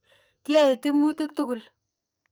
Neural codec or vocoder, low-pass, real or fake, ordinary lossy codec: codec, 44.1 kHz, 2.6 kbps, SNAC; none; fake; none